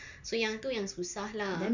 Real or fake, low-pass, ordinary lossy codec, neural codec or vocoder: real; 7.2 kHz; none; none